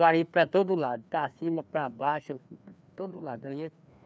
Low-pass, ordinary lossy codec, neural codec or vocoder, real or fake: none; none; codec, 16 kHz, 2 kbps, FreqCodec, larger model; fake